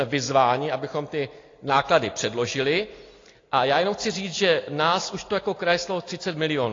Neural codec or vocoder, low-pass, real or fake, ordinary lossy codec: none; 7.2 kHz; real; AAC, 32 kbps